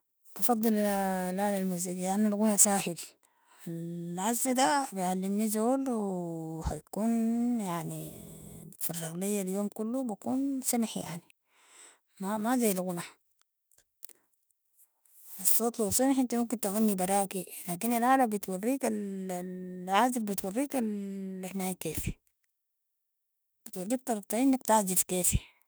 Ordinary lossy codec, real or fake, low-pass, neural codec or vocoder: none; fake; none; autoencoder, 48 kHz, 32 numbers a frame, DAC-VAE, trained on Japanese speech